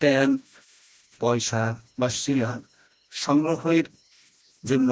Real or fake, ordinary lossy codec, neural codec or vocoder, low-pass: fake; none; codec, 16 kHz, 1 kbps, FreqCodec, smaller model; none